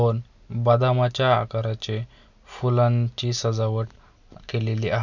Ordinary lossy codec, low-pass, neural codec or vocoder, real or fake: none; 7.2 kHz; none; real